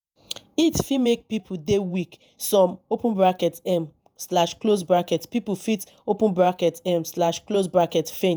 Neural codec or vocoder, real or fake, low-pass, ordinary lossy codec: vocoder, 48 kHz, 128 mel bands, Vocos; fake; none; none